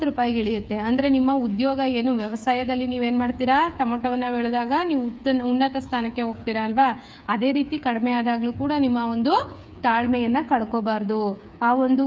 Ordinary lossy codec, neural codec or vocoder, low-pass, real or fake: none; codec, 16 kHz, 8 kbps, FreqCodec, smaller model; none; fake